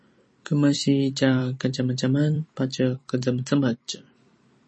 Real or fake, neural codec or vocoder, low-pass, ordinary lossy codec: fake; vocoder, 44.1 kHz, 128 mel bands every 256 samples, BigVGAN v2; 10.8 kHz; MP3, 32 kbps